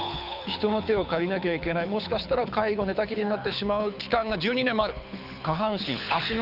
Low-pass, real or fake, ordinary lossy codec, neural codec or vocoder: 5.4 kHz; fake; none; codec, 24 kHz, 6 kbps, HILCodec